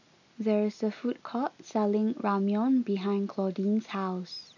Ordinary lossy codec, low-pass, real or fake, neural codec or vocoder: none; 7.2 kHz; real; none